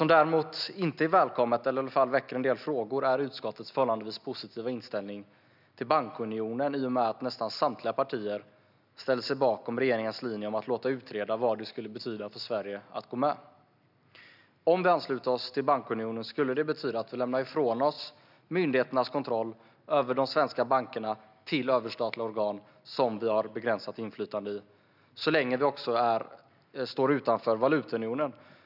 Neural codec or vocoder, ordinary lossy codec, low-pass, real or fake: none; none; 5.4 kHz; real